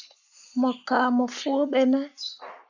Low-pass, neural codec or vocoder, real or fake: 7.2 kHz; codec, 44.1 kHz, 7.8 kbps, Pupu-Codec; fake